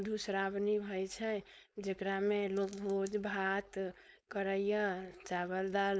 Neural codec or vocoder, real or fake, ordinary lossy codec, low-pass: codec, 16 kHz, 4.8 kbps, FACodec; fake; none; none